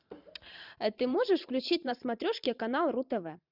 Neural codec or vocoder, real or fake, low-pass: vocoder, 44.1 kHz, 128 mel bands every 512 samples, BigVGAN v2; fake; 5.4 kHz